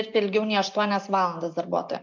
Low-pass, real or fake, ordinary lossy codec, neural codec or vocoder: 7.2 kHz; real; MP3, 48 kbps; none